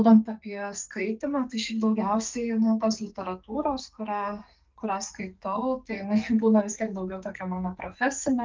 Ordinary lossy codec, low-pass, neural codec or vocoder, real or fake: Opus, 24 kbps; 7.2 kHz; codec, 44.1 kHz, 2.6 kbps, SNAC; fake